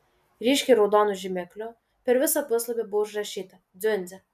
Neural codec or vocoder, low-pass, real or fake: none; 14.4 kHz; real